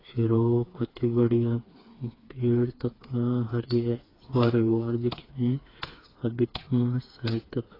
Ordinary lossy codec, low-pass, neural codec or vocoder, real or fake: AAC, 24 kbps; 5.4 kHz; codec, 16 kHz, 4 kbps, FreqCodec, smaller model; fake